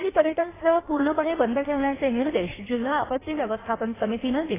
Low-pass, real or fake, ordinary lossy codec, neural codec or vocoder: 3.6 kHz; fake; AAC, 16 kbps; codec, 16 kHz in and 24 kHz out, 1.1 kbps, FireRedTTS-2 codec